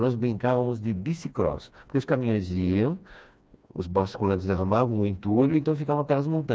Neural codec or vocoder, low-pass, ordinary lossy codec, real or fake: codec, 16 kHz, 2 kbps, FreqCodec, smaller model; none; none; fake